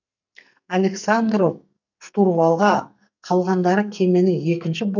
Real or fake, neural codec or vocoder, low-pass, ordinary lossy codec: fake; codec, 44.1 kHz, 2.6 kbps, SNAC; 7.2 kHz; none